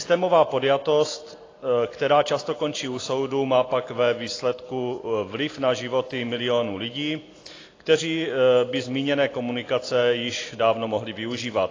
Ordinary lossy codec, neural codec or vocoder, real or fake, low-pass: AAC, 32 kbps; none; real; 7.2 kHz